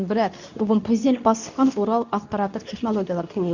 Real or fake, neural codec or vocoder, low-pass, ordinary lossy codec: fake; codec, 24 kHz, 0.9 kbps, WavTokenizer, medium speech release version 2; 7.2 kHz; none